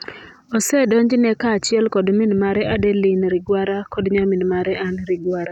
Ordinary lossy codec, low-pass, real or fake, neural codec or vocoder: none; 19.8 kHz; real; none